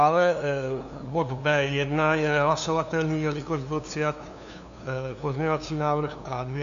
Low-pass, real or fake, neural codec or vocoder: 7.2 kHz; fake; codec, 16 kHz, 2 kbps, FunCodec, trained on LibriTTS, 25 frames a second